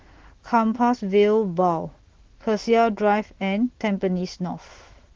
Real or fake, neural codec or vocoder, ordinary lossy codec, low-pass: fake; autoencoder, 48 kHz, 128 numbers a frame, DAC-VAE, trained on Japanese speech; Opus, 16 kbps; 7.2 kHz